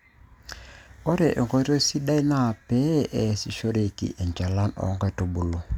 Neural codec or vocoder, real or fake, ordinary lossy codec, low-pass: none; real; none; 19.8 kHz